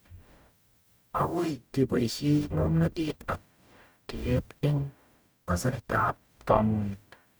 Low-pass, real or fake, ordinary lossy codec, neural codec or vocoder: none; fake; none; codec, 44.1 kHz, 0.9 kbps, DAC